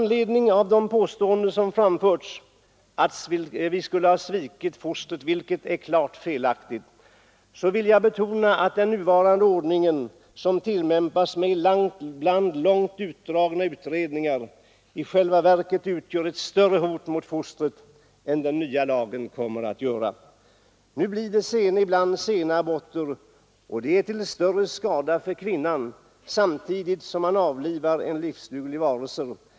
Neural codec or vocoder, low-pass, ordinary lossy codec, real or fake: none; none; none; real